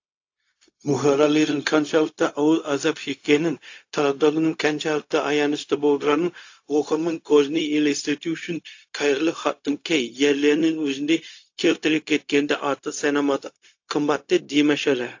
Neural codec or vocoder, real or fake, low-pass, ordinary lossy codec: codec, 16 kHz, 0.4 kbps, LongCat-Audio-Codec; fake; 7.2 kHz; AAC, 48 kbps